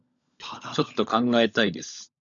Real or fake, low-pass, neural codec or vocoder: fake; 7.2 kHz; codec, 16 kHz, 16 kbps, FunCodec, trained on LibriTTS, 50 frames a second